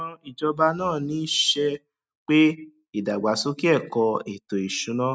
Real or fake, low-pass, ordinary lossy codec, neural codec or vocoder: real; none; none; none